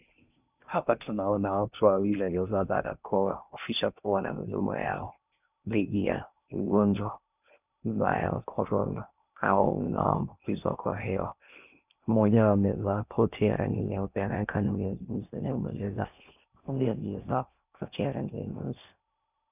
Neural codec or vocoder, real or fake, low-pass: codec, 16 kHz in and 24 kHz out, 0.6 kbps, FocalCodec, streaming, 4096 codes; fake; 3.6 kHz